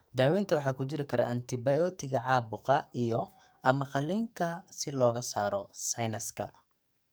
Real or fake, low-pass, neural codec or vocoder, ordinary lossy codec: fake; none; codec, 44.1 kHz, 2.6 kbps, SNAC; none